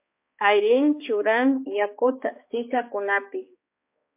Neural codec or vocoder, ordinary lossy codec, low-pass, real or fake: codec, 16 kHz, 2 kbps, X-Codec, HuBERT features, trained on balanced general audio; MP3, 24 kbps; 3.6 kHz; fake